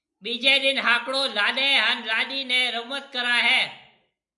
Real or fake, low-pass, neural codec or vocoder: real; 10.8 kHz; none